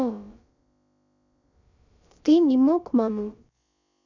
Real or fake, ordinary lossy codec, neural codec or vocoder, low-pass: fake; none; codec, 16 kHz, about 1 kbps, DyCAST, with the encoder's durations; 7.2 kHz